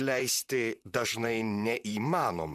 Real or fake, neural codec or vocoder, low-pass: fake; vocoder, 44.1 kHz, 128 mel bands, Pupu-Vocoder; 14.4 kHz